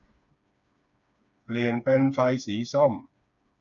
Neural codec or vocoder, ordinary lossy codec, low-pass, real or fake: codec, 16 kHz, 4 kbps, FreqCodec, smaller model; none; 7.2 kHz; fake